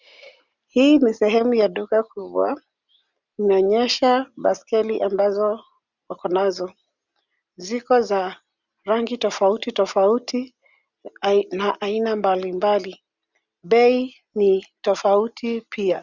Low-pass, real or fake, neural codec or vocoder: 7.2 kHz; real; none